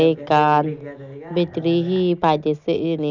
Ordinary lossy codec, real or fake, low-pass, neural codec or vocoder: none; real; 7.2 kHz; none